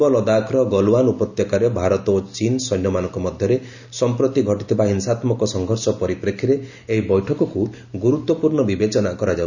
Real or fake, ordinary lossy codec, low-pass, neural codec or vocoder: real; none; 7.2 kHz; none